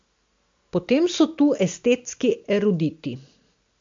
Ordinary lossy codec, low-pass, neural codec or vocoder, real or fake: MP3, 48 kbps; 7.2 kHz; none; real